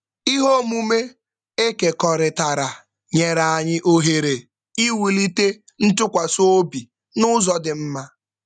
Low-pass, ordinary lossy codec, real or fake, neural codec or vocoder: 9.9 kHz; none; real; none